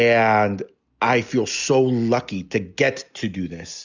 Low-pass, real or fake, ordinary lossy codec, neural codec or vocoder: 7.2 kHz; real; Opus, 64 kbps; none